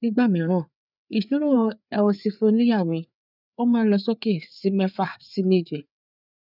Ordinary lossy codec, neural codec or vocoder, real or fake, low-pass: none; codec, 16 kHz, 4 kbps, FreqCodec, larger model; fake; 5.4 kHz